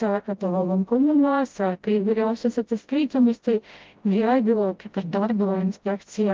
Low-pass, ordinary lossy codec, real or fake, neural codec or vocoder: 7.2 kHz; Opus, 24 kbps; fake; codec, 16 kHz, 0.5 kbps, FreqCodec, smaller model